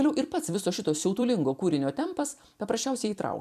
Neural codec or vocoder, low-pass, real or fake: vocoder, 44.1 kHz, 128 mel bands every 256 samples, BigVGAN v2; 14.4 kHz; fake